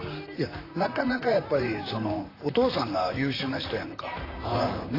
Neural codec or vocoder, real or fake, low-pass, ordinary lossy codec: vocoder, 44.1 kHz, 128 mel bands, Pupu-Vocoder; fake; 5.4 kHz; AAC, 24 kbps